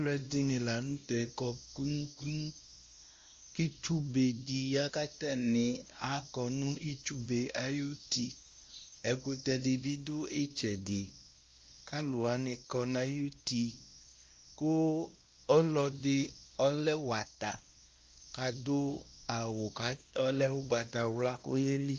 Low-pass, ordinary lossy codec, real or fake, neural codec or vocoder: 7.2 kHz; Opus, 32 kbps; fake; codec, 16 kHz, 1 kbps, X-Codec, WavLM features, trained on Multilingual LibriSpeech